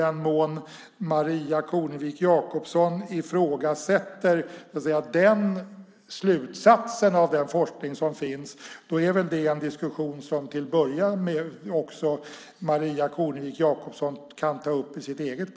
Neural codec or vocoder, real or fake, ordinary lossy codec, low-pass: none; real; none; none